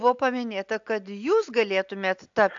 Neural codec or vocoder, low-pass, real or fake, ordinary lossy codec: none; 7.2 kHz; real; AAC, 64 kbps